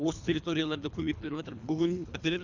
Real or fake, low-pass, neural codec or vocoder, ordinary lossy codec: fake; 7.2 kHz; codec, 24 kHz, 3 kbps, HILCodec; none